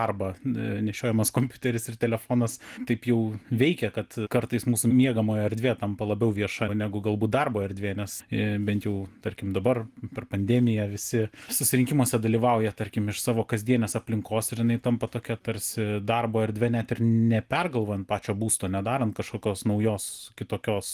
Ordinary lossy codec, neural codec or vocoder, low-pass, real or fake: Opus, 24 kbps; none; 14.4 kHz; real